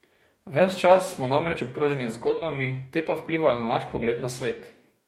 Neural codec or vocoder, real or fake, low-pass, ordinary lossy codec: codec, 44.1 kHz, 2.6 kbps, DAC; fake; 19.8 kHz; MP3, 64 kbps